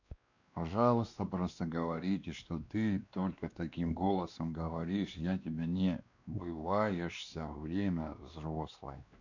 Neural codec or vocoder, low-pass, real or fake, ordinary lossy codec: codec, 16 kHz, 2 kbps, X-Codec, WavLM features, trained on Multilingual LibriSpeech; 7.2 kHz; fake; none